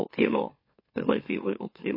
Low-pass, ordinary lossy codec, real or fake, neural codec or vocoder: 5.4 kHz; MP3, 24 kbps; fake; autoencoder, 44.1 kHz, a latent of 192 numbers a frame, MeloTTS